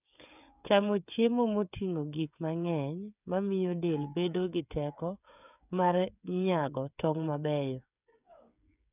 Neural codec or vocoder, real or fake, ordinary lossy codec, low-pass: codec, 16 kHz, 8 kbps, FreqCodec, smaller model; fake; none; 3.6 kHz